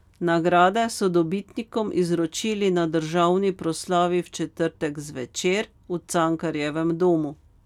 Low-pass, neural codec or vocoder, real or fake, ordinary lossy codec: 19.8 kHz; none; real; none